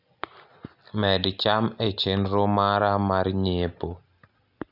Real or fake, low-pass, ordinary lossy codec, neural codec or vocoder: real; 5.4 kHz; none; none